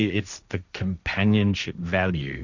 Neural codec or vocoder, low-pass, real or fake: codec, 16 kHz, 1.1 kbps, Voila-Tokenizer; 7.2 kHz; fake